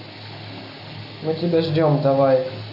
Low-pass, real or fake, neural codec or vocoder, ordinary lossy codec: 5.4 kHz; real; none; none